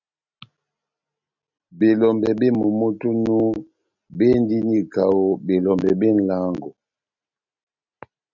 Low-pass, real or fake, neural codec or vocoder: 7.2 kHz; real; none